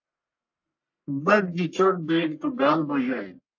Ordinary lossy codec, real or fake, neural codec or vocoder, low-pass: AAC, 48 kbps; fake; codec, 44.1 kHz, 1.7 kbps, Pupu-Codec; 7.2 kHz